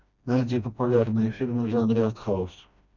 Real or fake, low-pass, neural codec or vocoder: fake; 7.2 kHz; codec, 16 kHz, 1 kbps, FreqCodec, smaller model